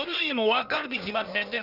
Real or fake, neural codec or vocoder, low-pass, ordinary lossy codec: fake; codec, 16 kHz, 0.8 kbps, ZipCodec; 5.4 kHz; Opus, 64 kbps